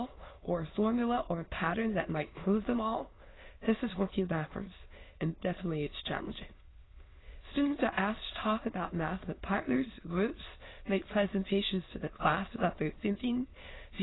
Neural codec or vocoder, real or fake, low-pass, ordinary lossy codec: autoencoder, 22.05 kHz, a latent of 192 numbers a frame, VITS, trained on many speakers; fake; 7.2 kHz; AAC, 16 kbps